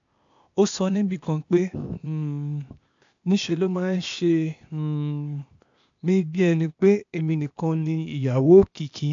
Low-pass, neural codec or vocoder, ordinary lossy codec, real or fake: 7.2 kHz; codec, 16 kHz, 0.8 kbps, ZipCodec; AAC, 64 kbps; fake